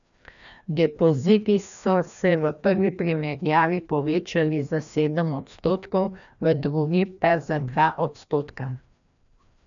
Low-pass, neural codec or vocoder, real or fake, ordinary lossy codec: 7.2 kHz; codec, 16 kHz, 1 kbps, FreqCodec, larger model; fake; none